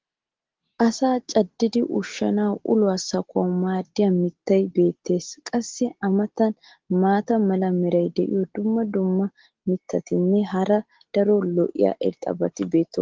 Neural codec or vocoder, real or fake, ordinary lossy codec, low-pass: none; real; Opus, 16 kbps; 7.2 kHz